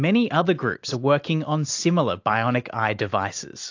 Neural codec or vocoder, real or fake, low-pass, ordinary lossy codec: none; real; 7.2 kHz; AAC, 48 kbps